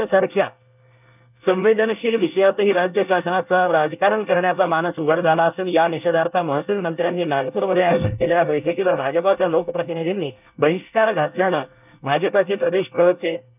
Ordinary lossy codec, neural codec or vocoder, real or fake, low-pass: none; codec, 24 kHz, 1 kbps, SNAC; fake; 3.6 kHz